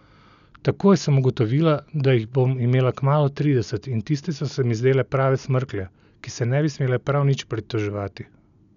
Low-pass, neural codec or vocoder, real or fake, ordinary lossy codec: 7.2 kHz; none; real; none